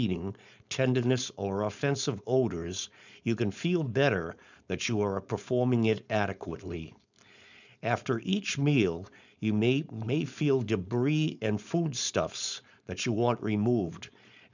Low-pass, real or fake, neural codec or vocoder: 7.2 kHz; fake; codec, 16 kHz, 4.8 kbps, FACodec